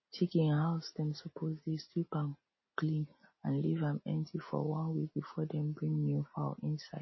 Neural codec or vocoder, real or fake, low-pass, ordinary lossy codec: none; real; 7.2 kHz; MP3, 24 kbps